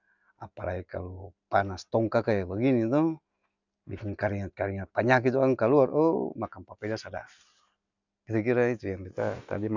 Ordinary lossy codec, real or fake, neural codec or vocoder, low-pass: none; real; none; 7.2 kHz